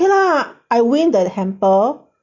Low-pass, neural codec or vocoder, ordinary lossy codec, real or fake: 7.2 kHz; none; none; real